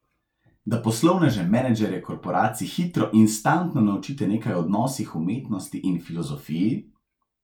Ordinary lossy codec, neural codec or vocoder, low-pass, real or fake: none; none; 19.8 kHz; real